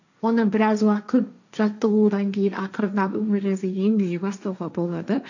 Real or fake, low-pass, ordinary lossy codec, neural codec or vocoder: fake; 7.2 kHz; none; codec, 16 kHz, 1.1 kbps, Voila-Tokenizer